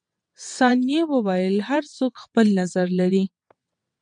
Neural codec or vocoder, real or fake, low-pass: vocoder, 22.05 kHz, 80 mel bands, WaveNeXt; fake; 9.9 kHz